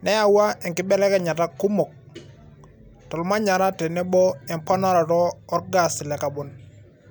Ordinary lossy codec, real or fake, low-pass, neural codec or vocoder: none; real; none; none